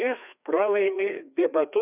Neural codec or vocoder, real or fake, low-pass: codec, 16 kHz, 2 kbps, FreqCodec, larger model; fake; 3.6 kHz